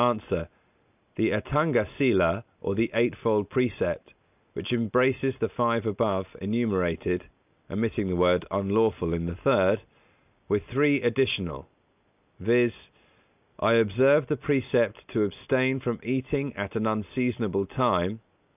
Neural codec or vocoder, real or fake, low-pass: none; real; 3.6 kHz